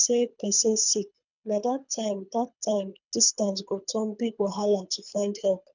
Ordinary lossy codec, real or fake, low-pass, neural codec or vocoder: none; fake; 7.2 kHz; codec, 24 kHz, 3 kbps, HILCodec